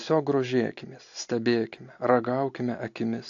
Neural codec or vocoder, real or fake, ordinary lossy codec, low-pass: none; real; AAC, 48 kbps; 7.2 kHz